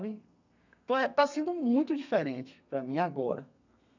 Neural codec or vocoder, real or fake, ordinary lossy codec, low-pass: codec, 32 kHz, 1.9 kbps, SNAC; fake; none; 7.2 kHz